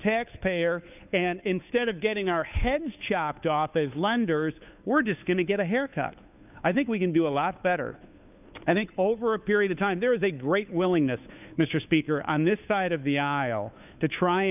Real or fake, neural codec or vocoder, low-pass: fake; codec, 16 kHz, 2 kbps, FunCodec, trained on Chinese and English, 25 frames a second; 3.6 kHz